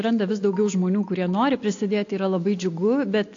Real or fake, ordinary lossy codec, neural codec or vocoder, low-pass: real; AAC, 48 kbps; none; 7.2 kHz